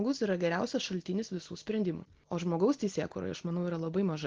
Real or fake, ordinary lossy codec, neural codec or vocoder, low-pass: real; Opus, 16 kbps; none; 7.2 kHz